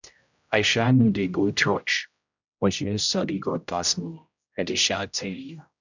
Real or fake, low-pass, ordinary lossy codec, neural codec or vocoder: fake; 7.2 kHz; none; codec, 16 kHz, 0.5 kbps, X-Codec, HuBERT features, trained on general audio